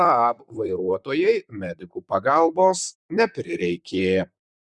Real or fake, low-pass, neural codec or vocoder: fake; 10.8 kHz; vocoder, 44.1 kHz, 128 mel bands, Pupu-Vocoder